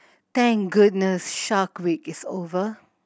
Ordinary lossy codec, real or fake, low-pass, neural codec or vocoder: none; real; none; none